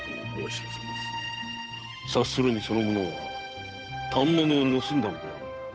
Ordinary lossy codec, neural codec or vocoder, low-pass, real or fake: none; codec, 16 kHz, 8 kbps, FunCodec, trained on Chinese and English, 25 frames a second; none; fake